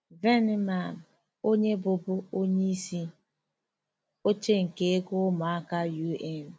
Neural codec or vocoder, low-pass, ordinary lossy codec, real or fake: none; none; none; real